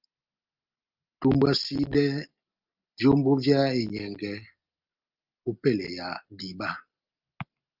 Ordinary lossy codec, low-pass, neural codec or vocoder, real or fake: Opus, 24 kbps; 5.4 kHz; none; real